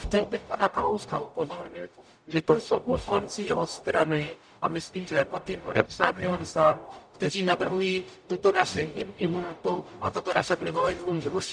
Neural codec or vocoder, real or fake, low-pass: codec, 44.1 kHz, 0.9 kbps, DAC; fake; 9.9 kHz